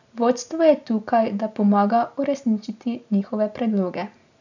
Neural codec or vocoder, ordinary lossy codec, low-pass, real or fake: none; none; 7.2 kHz; real